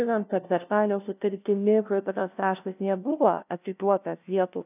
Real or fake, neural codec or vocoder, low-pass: fake; codec, 16 kHz, 0.5 kbps, FunCodec, trained on LibriTTS, 25 frames a second; 3.6 kHz